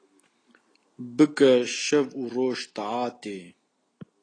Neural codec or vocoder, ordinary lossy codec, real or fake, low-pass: none; AAC, 32 kbps; real; 9.9 kHz